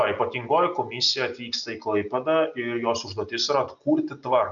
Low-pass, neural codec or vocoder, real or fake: 7.2 kHz; none; real